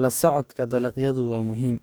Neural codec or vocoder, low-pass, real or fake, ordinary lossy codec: codec, 44.1 kHz, 2.6 kbps, DAC; none; fake; none